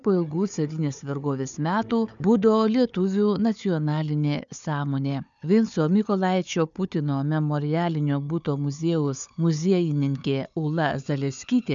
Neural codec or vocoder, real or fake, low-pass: codec, 16 kHz, 4 kbps, FunCodec, trained on Chinese and English, 50 frames a second; fake; 7.2 kHz